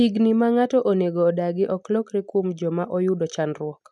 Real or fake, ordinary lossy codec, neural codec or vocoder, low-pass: real; none; none; none